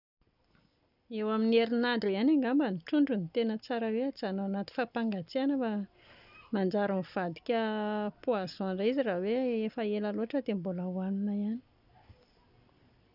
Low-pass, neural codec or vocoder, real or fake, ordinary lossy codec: 5.4 kHz; none; real; none